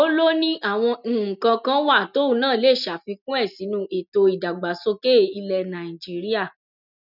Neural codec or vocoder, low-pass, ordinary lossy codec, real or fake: none; 5.4 kHz; none; real